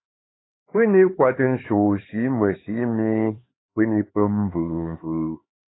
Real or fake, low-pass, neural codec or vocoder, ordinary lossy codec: fake; 7.2 kHz; codec, 16 kHz, 4 kbps, X-Codec, HuBERT features, trained on LibriSpeech; AAC, 16 kbps